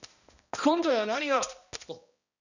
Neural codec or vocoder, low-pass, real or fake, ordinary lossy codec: codec, 16 kHz, 1 kbps, X-Codec, HuBERT features, trained on balanced general audio; 7.2 kHz; fake; none